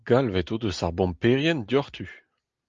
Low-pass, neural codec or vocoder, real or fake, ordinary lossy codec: 7.2 kHz; none; real; Opus, 32 kbps